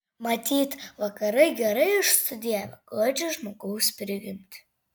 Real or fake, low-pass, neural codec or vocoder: real; 19.8 kHz; none